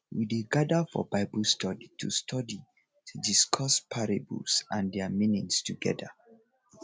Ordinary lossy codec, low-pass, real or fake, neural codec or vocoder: none; none; real; none